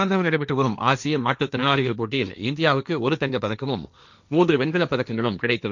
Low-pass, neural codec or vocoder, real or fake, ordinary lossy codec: 7.2 kHz; codec, 16 kHz, 1.1 kbps, Voila-Tokenizer; fake; none